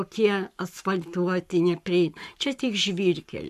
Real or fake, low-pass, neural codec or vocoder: fake; 14.4 kHz; codec, 44.1 kHz, 7.8 kbps, Pupu-Codec